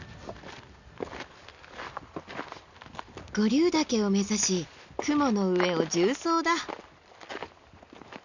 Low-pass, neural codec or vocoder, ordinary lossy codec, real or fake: 7.2 kHz; none; none; real